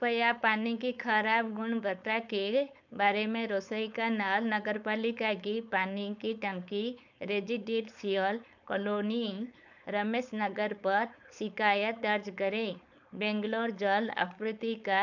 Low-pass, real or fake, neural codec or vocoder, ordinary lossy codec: 7.2 kHz; fake; codec, 16 kHz, 4.8 kbps, FACodec; none